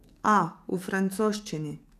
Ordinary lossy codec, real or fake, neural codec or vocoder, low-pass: none; fake; codec, 44.1 kHz, 7.8 kbps, DAC; 14.4 kHz